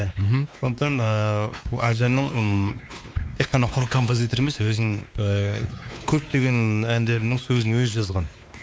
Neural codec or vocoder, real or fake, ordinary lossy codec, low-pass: codec, 16 kHz, 2 kbps, X-Codec, WavLM features, trained on Multilingual LibriSpeech; fake; none; none